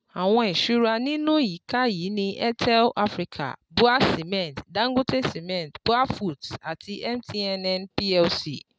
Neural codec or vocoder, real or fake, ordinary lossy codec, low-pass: none; real; none; none